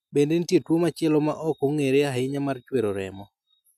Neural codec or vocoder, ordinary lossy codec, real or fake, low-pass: none; none; real; 14.4 kHz